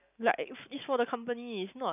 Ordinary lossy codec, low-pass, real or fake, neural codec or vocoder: none; 3.6 kHz; real; none